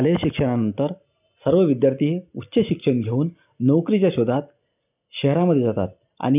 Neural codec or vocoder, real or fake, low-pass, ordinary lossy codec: none; real; 3.6 kHz; none